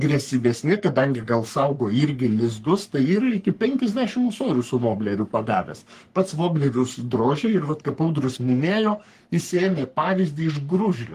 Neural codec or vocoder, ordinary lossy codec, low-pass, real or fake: codec, 44.1 kHz, 3.4 kbps, Pupu-Codec; Opus, 24 kbps; 14.4 kHz; fake